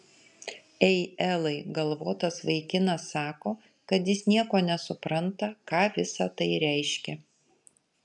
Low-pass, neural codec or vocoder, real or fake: 10.8 kHz; none; real